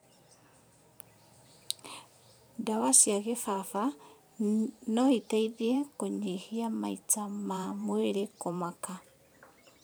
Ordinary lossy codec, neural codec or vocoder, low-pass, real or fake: none; vocoder, 44.1 kHz, 128 mel bands every 512 samples, BigVGAN v2; none; fake